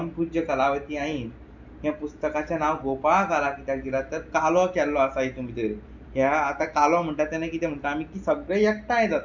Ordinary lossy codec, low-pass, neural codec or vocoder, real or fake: none; 7.2 kHz; none; real